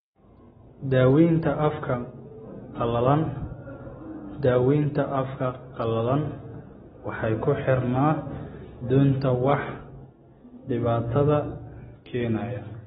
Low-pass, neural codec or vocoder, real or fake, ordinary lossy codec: 19.8 kHz; none; real; AAC, 16 kbps